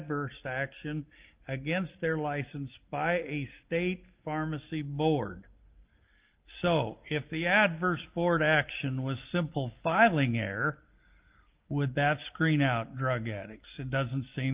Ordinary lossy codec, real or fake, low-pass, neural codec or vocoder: Opus, 32 kbps; real; 3.6 kHz; none